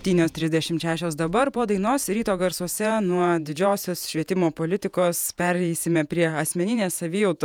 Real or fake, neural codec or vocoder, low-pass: fake; vocoder, 48 kHz, 128 mel bands, Vocos; 19.8 kHz